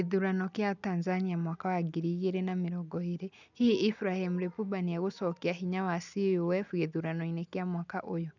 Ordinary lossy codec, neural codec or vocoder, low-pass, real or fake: none; none; 7.2 kHz; real